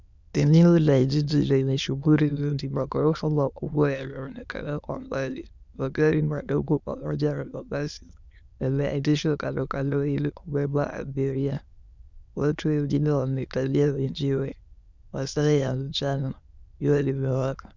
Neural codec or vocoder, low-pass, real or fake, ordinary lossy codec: autoencoder, 22.05 kHz, a latent of 192 numbers a frame, VITS, trained on many speakers; 7.2 kHz; fake; Opus, 64 kbps